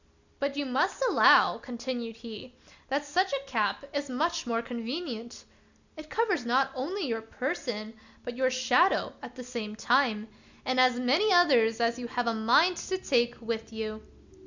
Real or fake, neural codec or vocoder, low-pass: real; none; 7.2 kHz